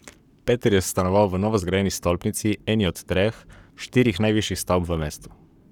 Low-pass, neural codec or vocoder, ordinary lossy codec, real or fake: 19.8 kHz; codec, 44.1 kHz, 7.8 kbps, Pupu-Codec; none; fake